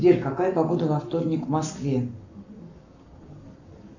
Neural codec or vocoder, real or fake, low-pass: codec, 44.1 kHz, 7.8 kbps, Pupu-Codec; fake; 7.2 kHz